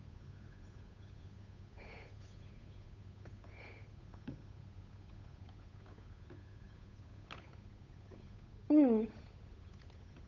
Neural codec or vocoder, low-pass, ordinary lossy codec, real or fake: codec, 16 kHz, 8 kbps, FunCodec, trained on Chinese and English, 25 frames a second; 7.2 kHz; none; fake